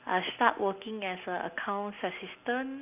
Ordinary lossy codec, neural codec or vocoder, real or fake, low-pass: none; none; real; 3.6 kHz